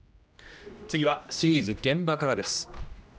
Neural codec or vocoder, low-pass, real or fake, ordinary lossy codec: codec, 16 kHz, 1 kbps, X-Codec, HuBERT features, trained on general audio; none; fake; none